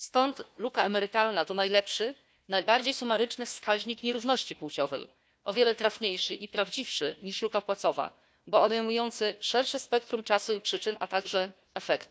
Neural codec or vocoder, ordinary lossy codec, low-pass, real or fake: codec, 16 kHz, 1 kbps, FunCodec, trained on Chinese and English, 50 frames a second; none; none; fake